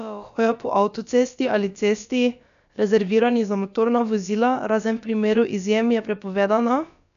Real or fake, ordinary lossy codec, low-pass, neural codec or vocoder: fake; none; 7.2 kHz; codec, 16 kHz, about 1 kbps, DyCAST, with the encoder's durations